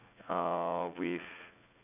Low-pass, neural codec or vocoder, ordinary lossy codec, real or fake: 3.6 kHz; vocoder, 44.1 kHz, 80 mel bands, Vocos; none; fake